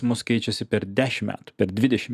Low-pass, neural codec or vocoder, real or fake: 14.4 kHz; none; real